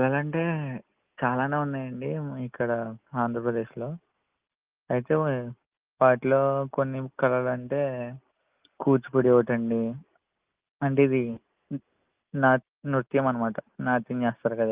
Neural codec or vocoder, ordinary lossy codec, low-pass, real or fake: none; Opus, 24 kbps; 3.6 kHz; real